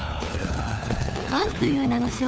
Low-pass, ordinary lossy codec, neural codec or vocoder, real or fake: none; none; codec, 16 kHz, 4 kbps, FunCodec, trained on LibriTTS, 50 frames a second; fake